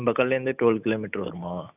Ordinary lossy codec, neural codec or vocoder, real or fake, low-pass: none; none; real; 3.6 kHz